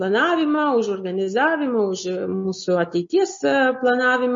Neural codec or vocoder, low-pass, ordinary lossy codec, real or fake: none; 7.2 kHz; MP3, 32 kbps; real